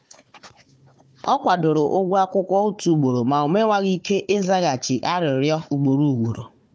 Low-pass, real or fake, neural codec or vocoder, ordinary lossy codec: none; fake; codec, 16 kHz, 4 kbps, FunCodec, trained on Chinese and English, 50 frames a second; none